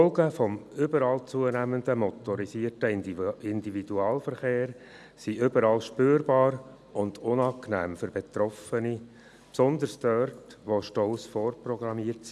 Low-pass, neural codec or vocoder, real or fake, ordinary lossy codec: none; none; real; none